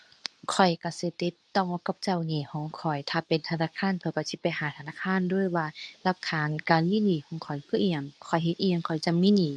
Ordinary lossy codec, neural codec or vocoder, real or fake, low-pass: none; codec, 24 kHz, 0.9 kbps, WavTokenizer, medium speech release version 2; fake; none